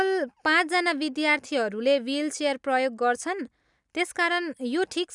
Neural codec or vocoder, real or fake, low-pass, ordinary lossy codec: none; real; 10.8 kHz; none